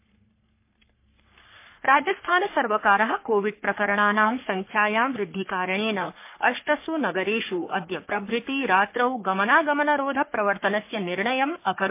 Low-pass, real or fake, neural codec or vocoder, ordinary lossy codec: 3.6 kHz; fake; codec, 44.1 kHz, 3.4 kbps, Pupu-Codec; MP3, 24 kbps